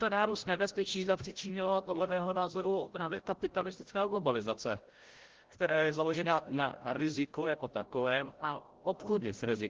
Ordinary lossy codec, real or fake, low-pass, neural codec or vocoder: Opus, 16 kbps; fake; 7.2 kHz; codec, 16 kHz, 0.5 kbps, FreqCodec, larger model